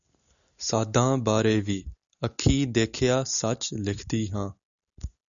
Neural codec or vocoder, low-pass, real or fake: none; 7.2 kHz; real